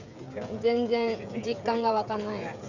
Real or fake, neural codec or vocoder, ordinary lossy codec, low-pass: fake; codec, 16 kHz, 16 kbps, FreqCodec, smaller model; Opus, 64 kbps; 7.2 kHz